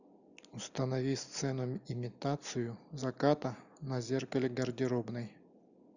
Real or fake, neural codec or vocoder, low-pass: real; none; 7.2 kHz